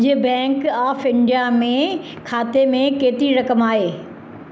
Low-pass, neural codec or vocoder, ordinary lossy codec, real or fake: none; none; none; real